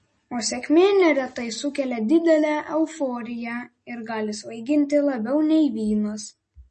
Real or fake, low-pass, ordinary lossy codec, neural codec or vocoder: real; 10.8 kHz; MP3, 32 kbps; none